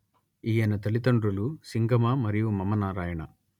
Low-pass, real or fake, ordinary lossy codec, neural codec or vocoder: 19.8 kHz; real; none; none